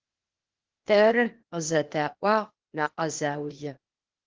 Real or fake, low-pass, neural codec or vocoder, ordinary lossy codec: fake; 7.2 kHz; codec, 16 kHz, 0.8 kbps, ZipCodec; Opus, 16 kbps